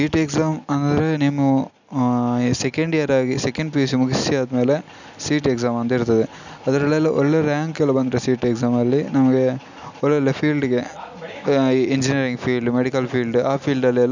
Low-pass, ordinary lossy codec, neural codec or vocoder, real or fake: 7.2 kHz; none; none; real